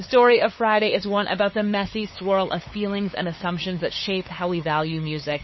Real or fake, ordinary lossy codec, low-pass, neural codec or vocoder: fake; MP3, 24 kbps; 7.2 kHz; codec, 16 kHz, 8 kbps, FunCodec, trained on LibriTTS, 25 frames a second